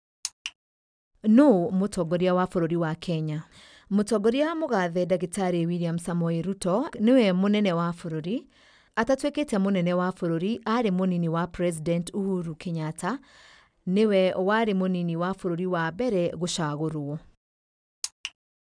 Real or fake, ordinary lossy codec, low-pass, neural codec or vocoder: real; none; 9.9 kHz; none